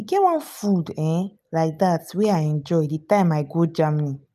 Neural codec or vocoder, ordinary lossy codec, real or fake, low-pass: none; none; real; 14.4 kHz